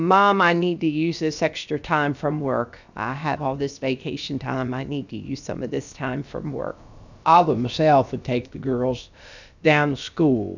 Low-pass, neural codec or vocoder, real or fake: 7.2 kHz; codec, 16 kHz, about 1 kbps, DyCAST, with the encoder's durations; fake